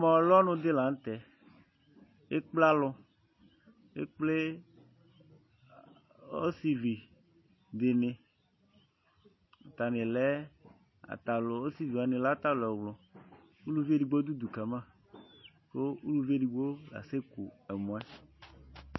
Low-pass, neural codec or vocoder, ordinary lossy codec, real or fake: 7.2 kHz; none; MP3, 24 kbps; real